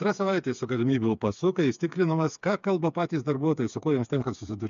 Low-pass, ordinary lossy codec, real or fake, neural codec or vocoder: 7.2 kHz; MP3, 64 kbps; fake; codec, 16 kHz, 4 kbps, FreqCodec, smaller model